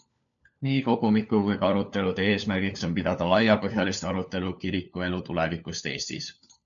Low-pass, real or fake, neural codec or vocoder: 7.2 kHz; fake; codec, 16 kHz, 4 kbps, FunCodec, trained on LibriTTS, 50 frames a second